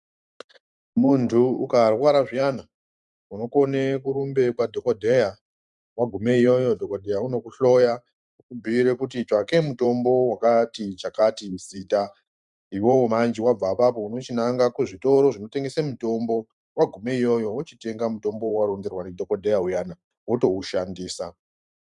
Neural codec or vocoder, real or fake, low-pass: vocoder, 44.1 kHz, 128 mel bands every 512 samples, BigVGAN v2; fake; 10.8 kHz